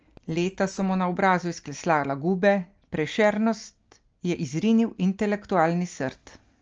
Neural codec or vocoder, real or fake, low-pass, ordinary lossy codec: none; real; 7.2 kHz; Opus, 24 kbps